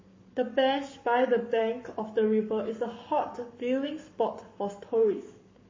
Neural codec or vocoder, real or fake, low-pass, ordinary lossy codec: codec, 44.1 kHz, 7.8 kbps, Pupu-Codec; fake; 7.2 kHz; MP3, 32 kbps